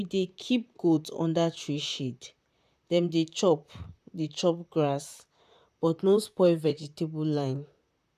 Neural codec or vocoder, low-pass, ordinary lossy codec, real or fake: vocoder, 44.1 kHz, 128 mel bands, Pupu-Vocoder; 14.4 kHz; none; fake